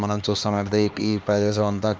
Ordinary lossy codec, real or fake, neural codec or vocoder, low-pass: none; fake; codec, 16 kHz, 2 kbps, X-Codec, HuBERT features, trained on LibriSpeech; none